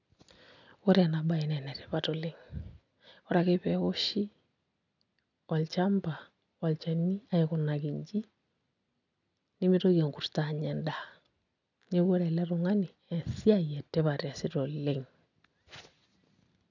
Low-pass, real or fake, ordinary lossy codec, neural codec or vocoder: 7.2 kHz; real; none; none